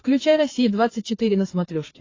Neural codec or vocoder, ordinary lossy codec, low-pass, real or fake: codec, 16 kHz, 4 kbps, FreqCodec, larger model; AAC, 32 kbps; 7.2 kHz; fake